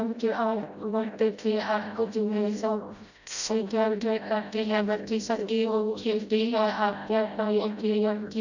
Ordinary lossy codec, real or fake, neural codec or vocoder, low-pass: none; fake; codec, 16 kHz, 0.5 kbps, FreqCodec, smaller model; 7.2 kHz